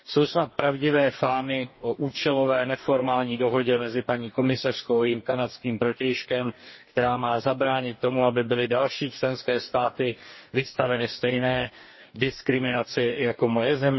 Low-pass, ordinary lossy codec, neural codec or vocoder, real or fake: 7.2 kHz; MP3, 24 kbps; codec, 44.1 kHz, 2.6 kbps, DAC; fake